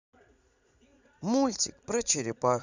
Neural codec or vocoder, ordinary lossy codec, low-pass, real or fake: vocoder, 44.1 kHz, 80 mel bands, Vocos; none; 7.2 kHz; fake